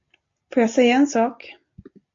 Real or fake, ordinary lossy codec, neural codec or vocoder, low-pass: real; MP3, 48 kbps; none; 7.2 kHz